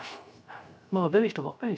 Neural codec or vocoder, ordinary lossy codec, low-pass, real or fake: codec, 16 kHz, 0.3 kbps, FocalCodec; none; none; fake